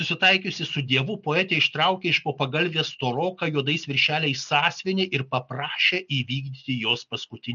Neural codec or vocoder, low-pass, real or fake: none; 7.2 kHz; real